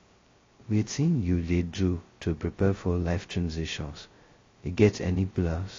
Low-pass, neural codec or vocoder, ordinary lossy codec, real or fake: 7.2 kHz; codec, 16 kHz, 0.2 kbps, FocalCodec; AAC, 32 kbps; fake